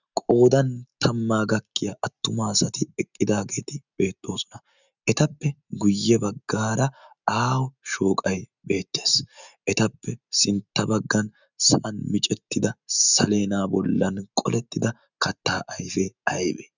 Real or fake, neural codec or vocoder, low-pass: real; none; 7.2 kHz